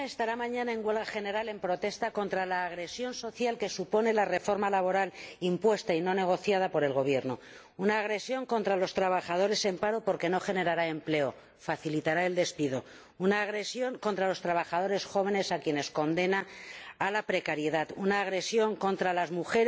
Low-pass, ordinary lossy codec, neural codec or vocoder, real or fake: none; none; none; real